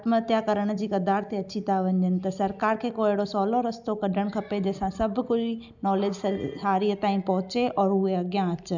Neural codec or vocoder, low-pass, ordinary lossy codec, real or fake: none; 7.2 kHz; none; real